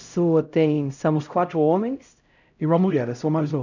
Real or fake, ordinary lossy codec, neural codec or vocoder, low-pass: fake; none; codec, 16 kHz, 0.5 kbps, X-Codec, HuBERT features, trained on LibriSpeech; 7.2 kHz